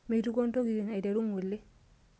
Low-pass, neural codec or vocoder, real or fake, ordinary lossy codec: none; none; real; none